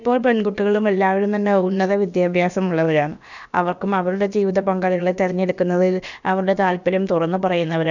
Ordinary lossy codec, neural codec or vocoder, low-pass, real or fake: none; codec, 16 kHz, about 1 kbps, DyCAST, with the encoder's durations; 7.2 kHz; fake